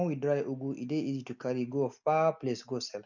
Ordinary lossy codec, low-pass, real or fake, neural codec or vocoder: none; 7.2 kHz; real; none